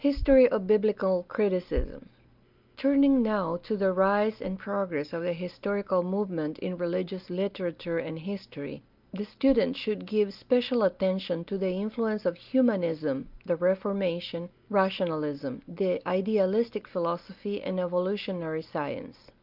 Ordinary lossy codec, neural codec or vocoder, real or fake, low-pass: Opus, 32 kbps; none; real; 5.4 kHz